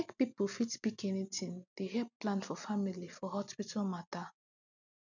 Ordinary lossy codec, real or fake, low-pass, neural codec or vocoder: none; real; 7.2 kHz; none